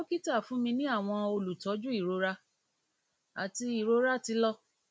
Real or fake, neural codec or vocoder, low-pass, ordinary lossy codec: real; none; none; none